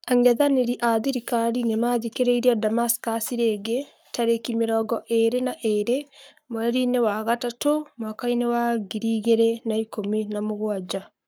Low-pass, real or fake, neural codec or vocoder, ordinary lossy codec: none; fake; codec, 44.1 kHz, 7.8 kbps, Pupu-Codec; none